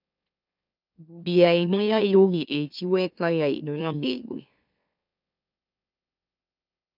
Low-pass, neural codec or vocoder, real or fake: 5.4 kHz; autoencoder, 44.1 kHz, a latent of 192 numbers a frame, MeloTTS; fake